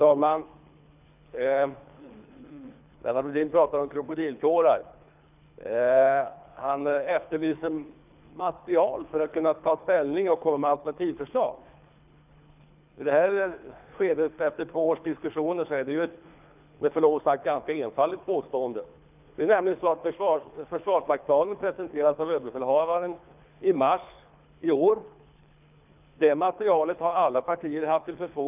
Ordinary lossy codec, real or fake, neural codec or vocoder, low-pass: none; fake; codec, 24 kHz, 3 kbps, HILCodec; 3.6 kHz